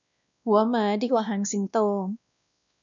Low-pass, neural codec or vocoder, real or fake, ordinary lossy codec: 7.2 kHz; codec, 16 kHz, 1 kbps, X-Codec, WavLM features, trained on Multilingual LibriSpeech; fake; MP3, 96 kbps